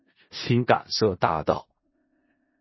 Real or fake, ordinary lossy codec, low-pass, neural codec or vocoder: fake; MP3, 24 kbps; 7.2 kHz; codec, 16 kHz in and 24 kHz out, 0.4 kbps, LongCat-Audio-Codec, four codebook decoder